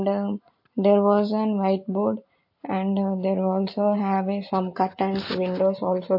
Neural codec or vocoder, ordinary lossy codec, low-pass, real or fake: none; MP3, 32 kbps; 5.4 kHz; real